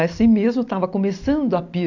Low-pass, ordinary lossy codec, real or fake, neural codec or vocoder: 7.2 kHz; AAC, 48 kbps; real; none